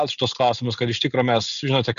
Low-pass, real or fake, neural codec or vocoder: 7.2 kHz; real; none